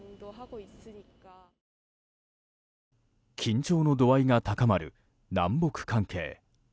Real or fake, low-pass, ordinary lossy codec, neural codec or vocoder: real; none; none; none